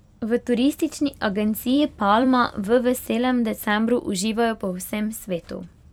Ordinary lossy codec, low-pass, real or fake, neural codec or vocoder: none; 19.8 kHz; fake; vocoder, 44.1 kHz, 128 mel bands every 256 samples, BigVGAN v2